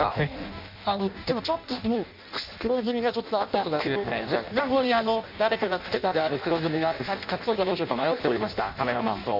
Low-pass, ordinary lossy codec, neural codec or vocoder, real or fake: 5.4 kHz; MP3, 48 kbps; codec, 16 kHz in and 24 kHz out, 0.6 kbps, FireRedTTS-2 codec; fake